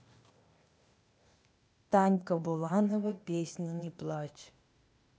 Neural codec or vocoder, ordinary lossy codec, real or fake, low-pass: codec, 16 kHz, 0.8 kbps, ZipCodec; none; fake; none